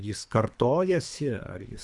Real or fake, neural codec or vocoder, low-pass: fake; codec, 24 kHz, 3 kbps, HILCodec; 10.8 kHz